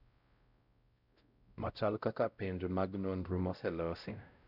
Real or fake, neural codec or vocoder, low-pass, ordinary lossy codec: fake; codec, 16 kHz, 0.5 kbps, X-Codec, WavLM features, trained on Multilingual LibriSpeech; 5.4 kHz; none